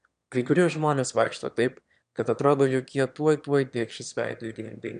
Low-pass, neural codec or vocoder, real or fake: 9.9 kHz; autoencoder, 22.05 kHz, a latent of 192 numbers a frame, VITS, trained on one speaker; fake